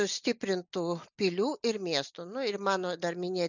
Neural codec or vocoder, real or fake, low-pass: none; real; 7.2 kHz